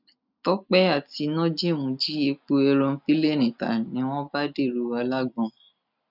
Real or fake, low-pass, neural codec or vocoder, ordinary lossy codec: real; 5.4 kHz; none; none